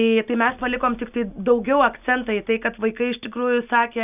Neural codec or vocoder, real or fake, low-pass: none; real; 3.6 kHz